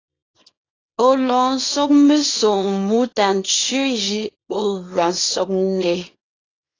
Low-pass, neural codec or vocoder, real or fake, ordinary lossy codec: 7.2 kHz; codec, 24 kHz, 0.9 kbps, WavTokenizer, small release; fake; AAC, 32 kbps